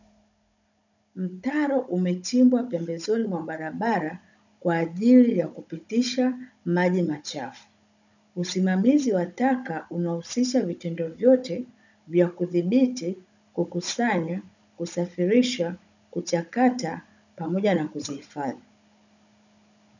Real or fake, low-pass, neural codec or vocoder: fake; 7.2 kHz; codec, 16 kHz, 16 kbps, FunCodec, trained on Chinese and English, 50 frames a second